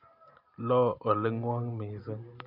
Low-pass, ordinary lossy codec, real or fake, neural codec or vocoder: 5.4 kHz; none; real; none